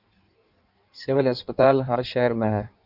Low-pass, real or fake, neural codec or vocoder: 5.4 kHz; fake; codec, 16 kHz in and 24 kHz out, 1.1 kbps, FireRedTTS-2 codec